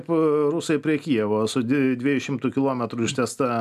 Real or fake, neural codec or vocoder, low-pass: real; none; 14.4 kHz